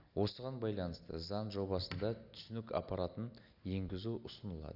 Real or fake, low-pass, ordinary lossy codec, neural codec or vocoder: real; 5.4 kHz; none; none